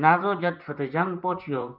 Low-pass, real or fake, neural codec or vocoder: 5.4 kHz; fake; vocoder, 44.1 kHz, 128 mel bands, Pupu-Vocoder